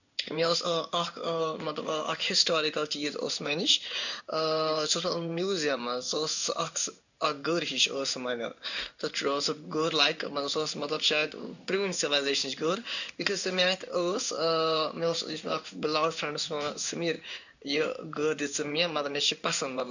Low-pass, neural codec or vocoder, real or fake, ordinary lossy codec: 7.2 kHz; codec, 16 kHz in and 24 kHz out, 1 kbps, XY-Tokenizer; fake; none